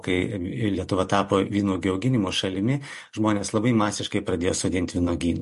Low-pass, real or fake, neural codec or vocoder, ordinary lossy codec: 14.4 kHz; real; none; MP3, 48 kbps